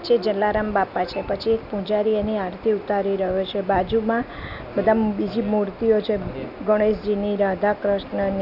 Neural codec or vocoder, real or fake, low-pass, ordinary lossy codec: none; real; 5.4 kHz; none